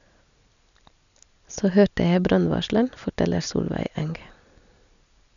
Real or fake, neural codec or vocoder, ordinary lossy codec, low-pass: real; none; none; 7.2 kHz